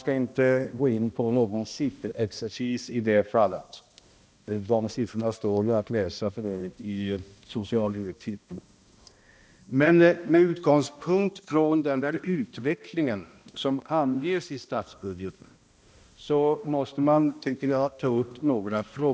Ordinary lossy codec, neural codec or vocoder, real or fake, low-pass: none; codec, 16 kHz, 1 kbps, X-Codec, HuBERT features, trained on balanced general audio; fake; none